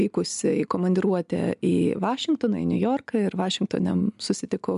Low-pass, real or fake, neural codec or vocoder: 10.8 kHz; real; none